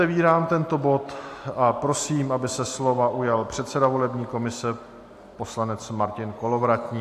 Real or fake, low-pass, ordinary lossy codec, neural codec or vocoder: real; 14.4 kHz; AAC, 64 kbps; none